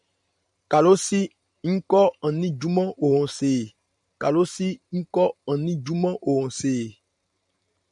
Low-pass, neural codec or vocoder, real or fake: 10.8 kHz; vocoder, 44.1 kHz, 128 mel bands every 512 samples, BigVGAN v2; fake